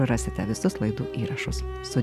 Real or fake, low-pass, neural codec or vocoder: real; 14.4 kHz; none